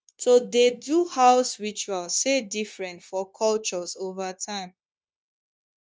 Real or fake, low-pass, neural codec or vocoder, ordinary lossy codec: fake; none; codec, 16 kHz, 0.9 kbps, LongCat-Audio-Codec; none